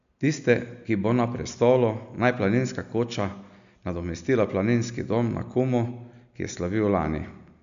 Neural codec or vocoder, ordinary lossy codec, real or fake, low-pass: none; none; real; 7.2 kHz